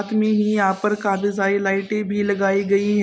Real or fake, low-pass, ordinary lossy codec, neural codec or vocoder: real; none; none; none